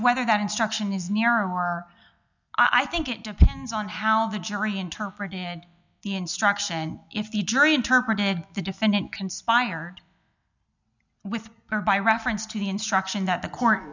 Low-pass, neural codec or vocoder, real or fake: 7.2 kHz; none; real